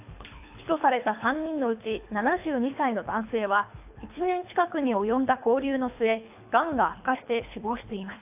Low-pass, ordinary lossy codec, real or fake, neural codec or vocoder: 3.6 kHz; AAC, 32 kbps; fake; codec, 24 kHz, 3 kbps, HILCodec